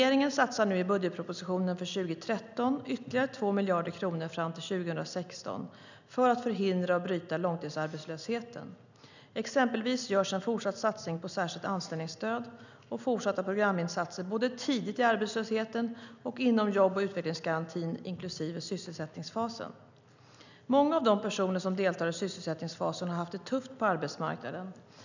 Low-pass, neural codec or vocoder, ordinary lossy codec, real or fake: 7.2 kHz; none; none; real